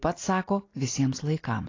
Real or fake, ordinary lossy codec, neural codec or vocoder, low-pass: real; AAC, 32 kbps; none; 7.2 kHz